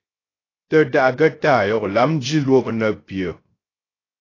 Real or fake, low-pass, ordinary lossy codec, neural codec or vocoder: fake; 7.2 kHz; AAC, 32 kbps; codec, 16 kHz, 0.3 kbps, FocalCodec